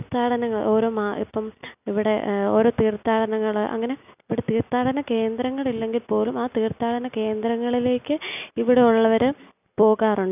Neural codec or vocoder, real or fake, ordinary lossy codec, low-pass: none; real; none; 3.6 kHz